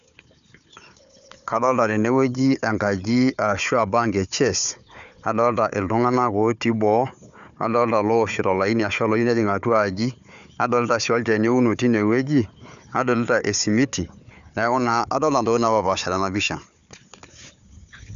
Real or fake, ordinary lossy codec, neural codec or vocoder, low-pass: fake; none; codec, 16 kHz, 4 kbps, FunCodec, trained on Chinese and English, 50 frames a second; 7.2 kHz